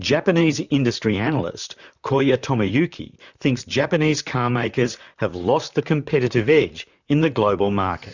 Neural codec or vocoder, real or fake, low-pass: vocoder, 44.1 kHz, 128 mel bands, Pupu-Vocoder; fake; 7.2 kHz